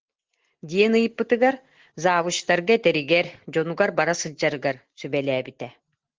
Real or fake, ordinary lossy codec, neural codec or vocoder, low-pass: real; Opus, 16 kbps; none; 7.2 kHz